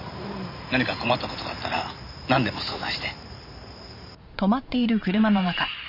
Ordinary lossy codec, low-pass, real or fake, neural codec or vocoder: none; 5.4 kHz; fake; vocoder, 44.1 kHz, 128 mel bands every 512 samples, BigVGAN v2